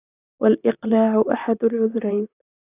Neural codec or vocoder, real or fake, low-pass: vocoder, 44.1 kHz, 128 mel bands, Pupu-Vocoder; fake; 3.6 kHz